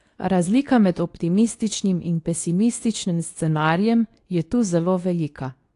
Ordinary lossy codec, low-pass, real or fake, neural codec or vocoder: AAC, 48 kbps; 10.8 kHz; fake; codec, 24 kHz, 0.9 kbps, WavTokenizer, medium speech release version 2